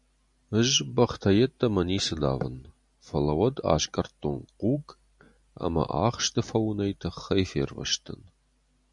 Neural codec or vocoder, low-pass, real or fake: none; 10.8 kHz; real